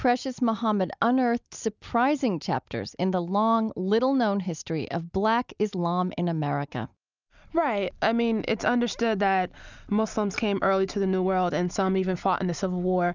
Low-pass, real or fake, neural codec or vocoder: 7.2 kHz; real; none